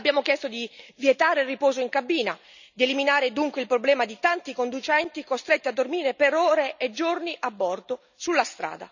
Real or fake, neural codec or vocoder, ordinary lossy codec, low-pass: real; none; none; 7.2 kHz